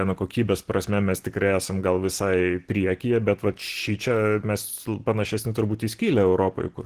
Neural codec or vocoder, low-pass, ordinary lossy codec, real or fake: none; 14.4 kHz; Opus, 16 kbps; real